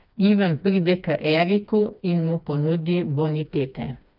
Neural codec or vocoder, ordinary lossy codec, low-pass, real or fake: codec, 16 kHz, 2 kbps, FreqCodec, smaller model; none; 5.4 kHz; fake